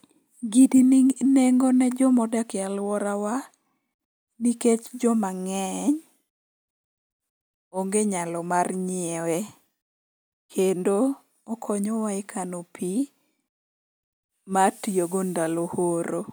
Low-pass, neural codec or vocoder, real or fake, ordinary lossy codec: none; none; real; none